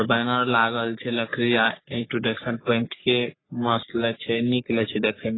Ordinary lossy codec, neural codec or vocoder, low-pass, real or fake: AAC, 16 kbps; codec, 44.1 kHz, 3.4 kbps, Pupu-Codec; 7.2 kHz; fake